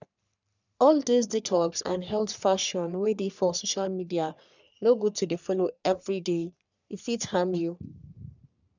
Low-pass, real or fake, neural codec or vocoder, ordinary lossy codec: 7.2 kHz; fake; codec, 44.1 kHz, 3.4 kbps, Pupu-Codec; none